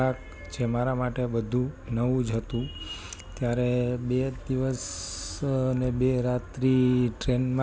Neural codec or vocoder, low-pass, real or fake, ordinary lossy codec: none; none; real; none